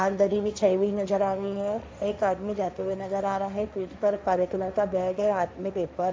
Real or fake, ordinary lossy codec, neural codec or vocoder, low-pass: fake; none; codec, 16 kHz, 1.1 kbps, Voila-Tokenizer; none